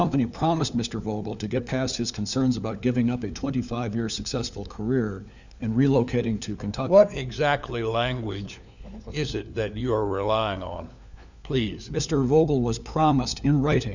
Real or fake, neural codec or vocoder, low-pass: fake; codec, 16 kHz, 4 kbps, FunCodec, trained on LibriTTS, 50 frames a second; 7.2 kHz